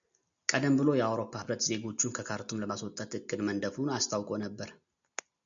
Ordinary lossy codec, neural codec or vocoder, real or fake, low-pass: MP3, 96 kbps; none; real; 7.2 kHz